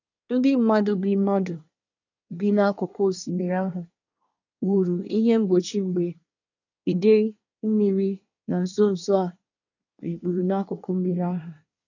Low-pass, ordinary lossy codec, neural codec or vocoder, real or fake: 7.2 kHz; none; codec, 24 kHz, 1 kbps, SNAC; fake